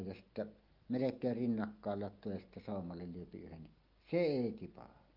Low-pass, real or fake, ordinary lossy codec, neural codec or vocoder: 5.4 kHz; real; Opus, 24 kbps; none